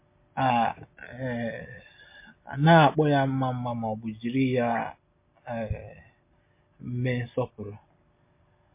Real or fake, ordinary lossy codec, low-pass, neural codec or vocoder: real; MP3, 24 kbps; 3.6 kHz; none